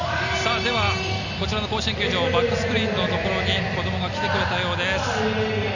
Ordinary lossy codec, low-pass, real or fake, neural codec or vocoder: none; 7.2 kHz; real; none